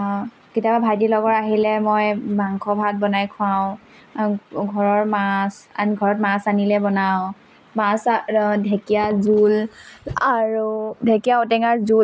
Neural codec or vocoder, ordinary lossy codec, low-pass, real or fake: none; none; none; real